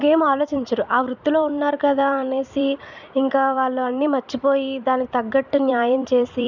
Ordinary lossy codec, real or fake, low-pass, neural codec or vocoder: none; fake; 7.2 kHz; vocoder, 44.1 kHz, 128 mel bands every 256 samples, BigVGAN v2